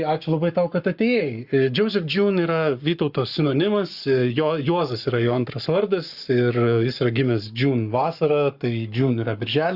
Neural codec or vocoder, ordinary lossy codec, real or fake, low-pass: codec, 44.1 kHz, 7.8 kbps, Pupu-Codec; Opus, 64 kbps; fake; 5.4 kHz